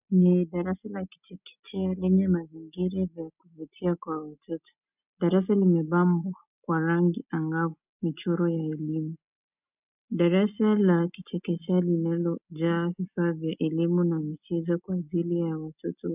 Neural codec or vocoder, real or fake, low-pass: none; real; 3.6 kHz